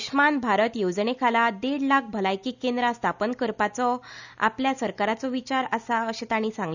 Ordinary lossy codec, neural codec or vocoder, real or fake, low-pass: none; none; real; 7.2 kHz